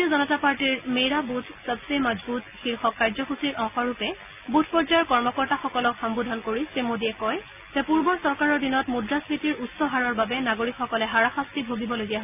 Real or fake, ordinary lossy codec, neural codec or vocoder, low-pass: real; none; none; 3.6 kHz